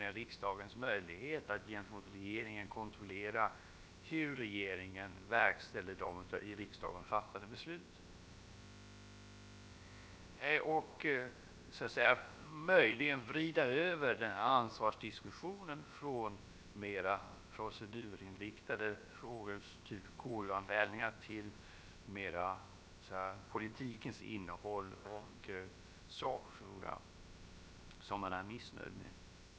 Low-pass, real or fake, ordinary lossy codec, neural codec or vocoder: none; fake; none; codec, 16 kHz, about 1 kbps, DyCAST, with the encoder's durations